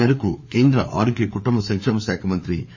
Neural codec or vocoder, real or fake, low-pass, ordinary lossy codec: codec, 16 kHz, 4 kbps, FreqCodec, larger model; fake; 7.2 kHz; MP3, 32 kbps